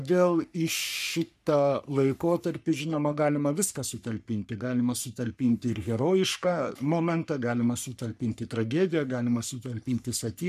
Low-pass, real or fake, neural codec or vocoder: 14.4 kHz; fake; codec, 44.1 kHz, 3.4 kbps, Pupu-Codec